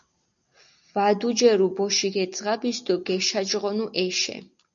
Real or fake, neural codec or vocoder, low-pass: real; none; 7.2 kHz